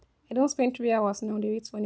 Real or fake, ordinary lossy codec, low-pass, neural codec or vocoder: real; none; none; none